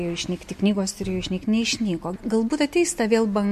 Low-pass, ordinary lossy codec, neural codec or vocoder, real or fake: 14.4 kHz; MP3, 64 kbps; none; real